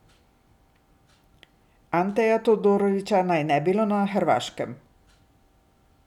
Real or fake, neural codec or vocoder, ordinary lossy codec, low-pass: real; none; none; 19.8 kHz